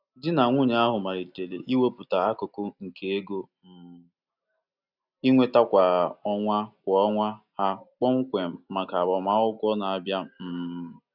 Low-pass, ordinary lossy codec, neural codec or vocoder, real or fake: 5.4 kHz; none; none; real